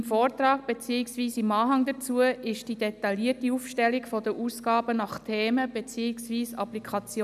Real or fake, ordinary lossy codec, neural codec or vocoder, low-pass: real; none; none; 14.4 kHz